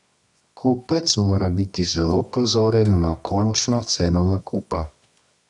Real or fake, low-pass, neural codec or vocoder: fake; 10.8 kHz; codec, 24 kHz, 0.9 kbps, WavTokenizer, medium music audio release